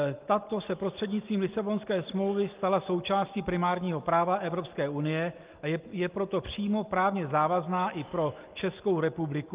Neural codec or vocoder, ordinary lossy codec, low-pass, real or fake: none; Opus, 32 kbps; 3.6 kHz; real